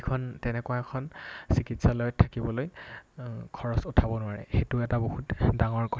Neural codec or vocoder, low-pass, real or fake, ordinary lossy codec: none; none; real; none